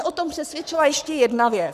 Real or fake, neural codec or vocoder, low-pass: fake; codec, 44.1 kHz, 7.8 kbps, Pupu-Codec; 14.4 kHz